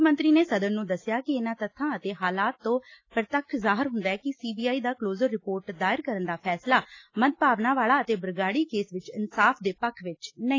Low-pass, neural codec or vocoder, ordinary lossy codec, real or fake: 7.2 kHz; none; AAC, 32 kbps; real